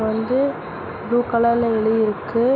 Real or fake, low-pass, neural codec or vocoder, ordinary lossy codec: real; 7.2 kHz; none; none